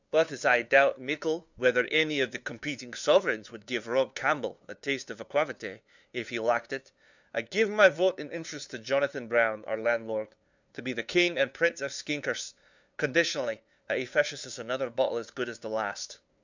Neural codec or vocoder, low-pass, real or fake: codec, 16 kHz, 2 kbps, FunCodec, trained on LibriTTS, 25 frames a second; 7.2 kHz; fake